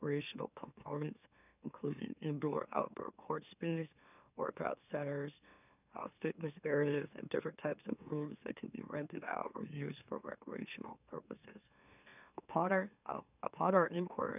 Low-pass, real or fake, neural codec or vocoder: 3.6 kHz; fake; autoencoder, 44.1 kHz, a latent of 192 numbers a frame, MeloTTS